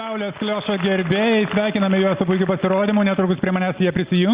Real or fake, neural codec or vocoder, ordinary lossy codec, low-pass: real; none; Opus, 64 kbps; 3.6 kHz